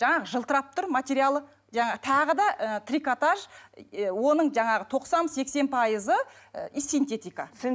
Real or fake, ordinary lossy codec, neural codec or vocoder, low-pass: real; none; none; none